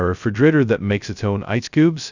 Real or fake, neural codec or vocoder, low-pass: fake; codec, 16 kHz, 0.2 kbps, FocalCodec; 7.2 kHz